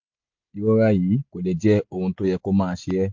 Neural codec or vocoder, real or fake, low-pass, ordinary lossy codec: none; real; 7.2 kHz; MP3, 48 kbps